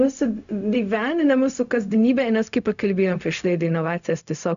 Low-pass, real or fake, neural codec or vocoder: 7.2 kHz; fake; codec, 16 kHz, 0.4 kbps, LongCat-Audio-Codec